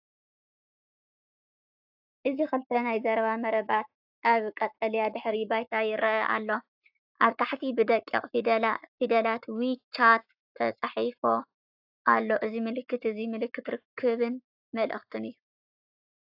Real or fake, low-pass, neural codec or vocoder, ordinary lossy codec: fake; 5.4 kHz; codec, 44.1 kHz, 7.8 kbps, Pupu-Codec; AAC, 48 kbps